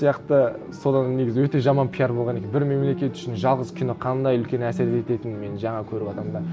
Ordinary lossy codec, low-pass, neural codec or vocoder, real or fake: none; none; none; real